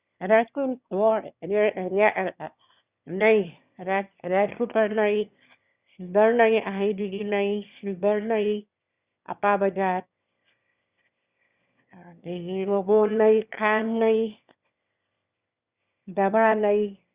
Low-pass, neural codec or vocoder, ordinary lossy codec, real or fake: 3.6 kHz; autoencoder, 22.05 kHz, a latent of 192 numbers a frame, VITS, trained on one speaker; Opus, 64 kbps; fake